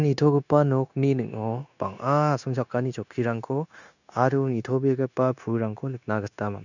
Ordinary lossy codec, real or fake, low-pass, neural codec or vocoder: none; fake; 7.2 kHz; codec, 16 kHz, 0.9 kbps, LongCat-Audio-Codec